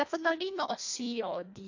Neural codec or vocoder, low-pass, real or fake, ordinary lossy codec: codec, 24 kHz, 1.5 kbps, HILCodec; 7.2 kHz; fake; AAC, 48 kbps